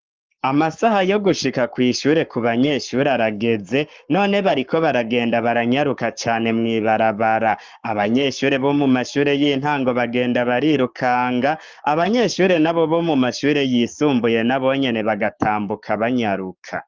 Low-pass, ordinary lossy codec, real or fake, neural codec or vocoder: 7.2 kHz; Opus, 24 kbps; fake; codec, 44.1 kHz, 7.8 kbps, Pupu-Codec